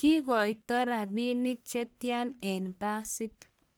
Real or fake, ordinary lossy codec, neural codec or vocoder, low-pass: fake; none; codec, 44.1 kHz, 1.7 kbps, Pupu-Codec; none